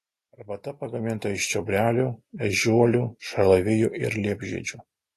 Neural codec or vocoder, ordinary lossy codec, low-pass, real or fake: none; AAC, 48 kbps; 14.4 kHz; real